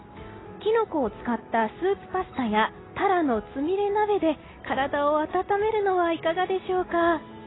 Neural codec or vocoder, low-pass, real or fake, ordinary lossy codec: none; 7.2 kHz; real; AAC, 16 kbps